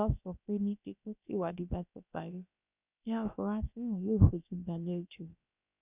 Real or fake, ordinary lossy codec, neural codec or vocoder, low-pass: fake; none; codec, 16 kHz, about 1 kbps, DyCAST, with the encoder's durations; 3.6 kHz